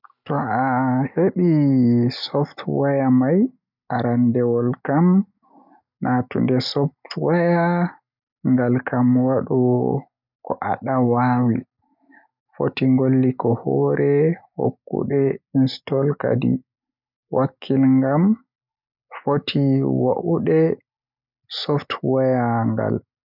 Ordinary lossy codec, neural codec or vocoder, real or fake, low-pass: none; none; real; 5.4 kHz